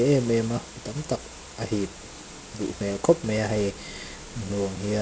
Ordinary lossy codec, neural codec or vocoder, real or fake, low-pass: none; none; real; none